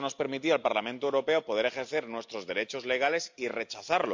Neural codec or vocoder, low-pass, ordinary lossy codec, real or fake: none; 7.2 kHz; MP3, 64 kbps; real